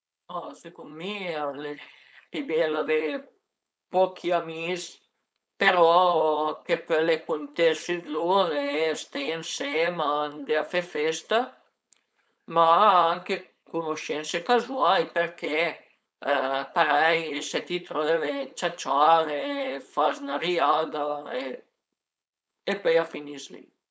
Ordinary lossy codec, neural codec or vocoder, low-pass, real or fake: none; codec, 16 kHz, 4.8 kbps, FACodec; none; fake